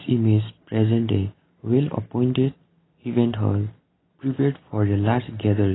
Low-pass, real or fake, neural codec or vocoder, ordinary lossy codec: 7.2 kHz; real; none; AAC, 16 kbps